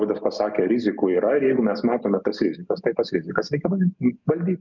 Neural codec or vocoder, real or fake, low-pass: none; real; 7.2 kHz